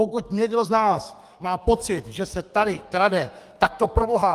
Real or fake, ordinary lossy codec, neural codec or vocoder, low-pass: fake; Opus, 32 kbps; codec, 32 kHz, 1.9 kbps, SNAC; 14.4 kHz